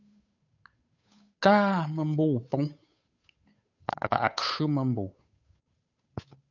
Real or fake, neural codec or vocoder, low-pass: fake; codec, 16 kHz, 8 kbps, FunCodec, trained on Chinese and English, 25 frames a second; 7.2 kHz